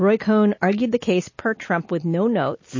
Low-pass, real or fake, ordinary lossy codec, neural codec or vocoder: 7.2 kHz; real; MP3, 32 kbps; none